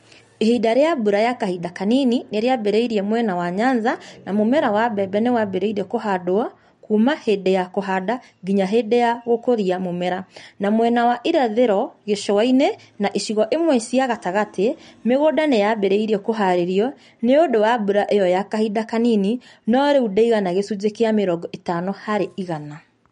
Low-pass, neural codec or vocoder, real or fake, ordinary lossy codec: 19.8 kHz; none; real; MP3, 48 kbps